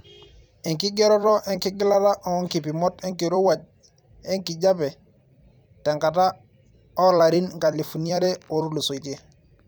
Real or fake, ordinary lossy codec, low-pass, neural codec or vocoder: fake; none; none; vocoder, 44.1 kHz, 128 mel bands every 256 samples, BigVGAN v2